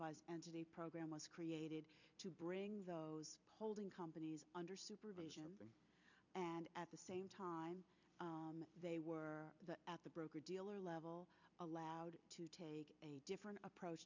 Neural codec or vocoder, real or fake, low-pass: vocoder, 44.1 kHz, 128 mel bands every 256 samples, BigVGAN v2; fake; 7.2 kHz